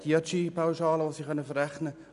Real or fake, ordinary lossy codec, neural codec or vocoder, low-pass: real; none; none; 10.8 kHz